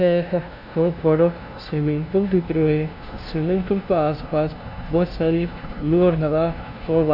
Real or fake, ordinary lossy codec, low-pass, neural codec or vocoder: fake; none; 5.4 kHz; codec, 16 kHz, 0.5 kbps, FunCodec, trained on LibriTTS, 25 frames a second